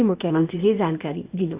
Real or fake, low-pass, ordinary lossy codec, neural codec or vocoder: fake; 3.6 kHz; Opus, 64 kbps; codec, 16 kHz, 0.8 kbps, ZipCodec